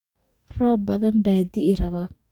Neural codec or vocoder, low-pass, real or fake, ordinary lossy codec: codec, 44.1 kHz, 2.6 kbps, DAC; 19.8 kHz; fake; none